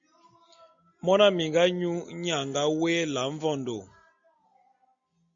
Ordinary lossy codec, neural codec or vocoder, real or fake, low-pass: MP3, 48 kbps; none; real; 7.2 kHz